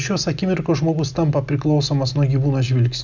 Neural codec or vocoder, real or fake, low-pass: none; real; 7.2 kHz